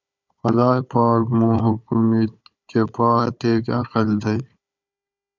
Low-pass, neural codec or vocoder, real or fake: 7.2 kHz; codec, 16 kHz, 4 kbps, FunCodec, trained on Chinese and English, 50 frames a second; fake